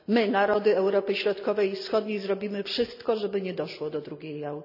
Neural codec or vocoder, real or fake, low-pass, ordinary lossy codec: none; real; 5.4 kHz; none